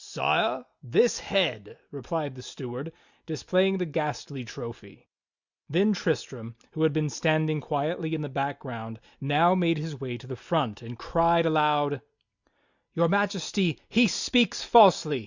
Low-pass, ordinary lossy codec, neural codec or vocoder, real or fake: 7.2 kHz; Opus, 64 kbps; none; real